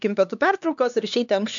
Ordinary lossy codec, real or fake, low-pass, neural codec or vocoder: MP3, 64 kbps; fake; 7.2 kHz; codec, 16 kHz, 2 kbps, X-Codec, HuBERT features, trained on LibriSpeech